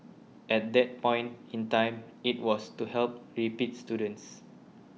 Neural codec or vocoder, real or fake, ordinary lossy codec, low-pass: none; real; none; none